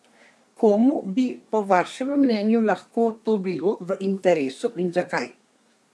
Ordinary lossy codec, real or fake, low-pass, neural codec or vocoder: none; fake; none; codec, 24 kHz, 1 kbps, SNAC